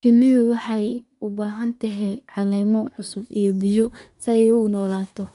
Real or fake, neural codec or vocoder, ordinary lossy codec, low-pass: fake; codec, 24 kHz, 1 kbps, SNAC; none; 10.8 kHz